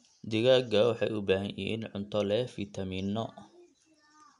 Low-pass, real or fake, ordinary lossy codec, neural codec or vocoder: 10.8 kHz; real; none; none